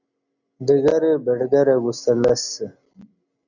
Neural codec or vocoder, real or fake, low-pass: none; real; 7.2 kHz